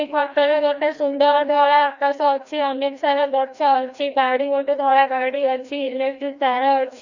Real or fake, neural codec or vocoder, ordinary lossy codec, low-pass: fake; codec, 16 kHz, 1 kbps, FreqCodec, larger model; none; 7.2 kHz